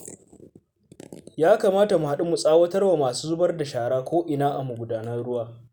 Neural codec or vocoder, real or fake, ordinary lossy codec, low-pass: none; real; none; none